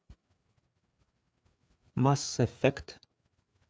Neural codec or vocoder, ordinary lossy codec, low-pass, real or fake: codec, 16 kHz, 4 kbps, FreqCodec, larger model; none; none; fake